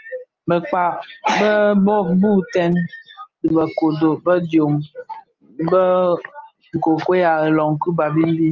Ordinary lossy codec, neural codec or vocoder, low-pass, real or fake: Opus, 32 kbps; none; 7.2 kHz; real